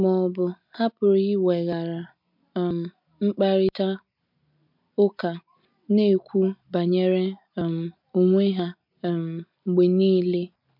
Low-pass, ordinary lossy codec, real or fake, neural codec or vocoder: 5.4 kHz; MP3, 48 kbps; real; none